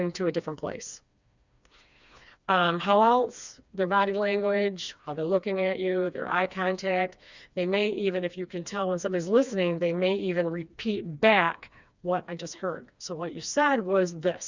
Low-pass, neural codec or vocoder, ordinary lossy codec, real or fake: 7.2 kHz; codec, 16 kHz, 2 kbps, FreqCodec, smaller model; Opus, 64 kbps; fake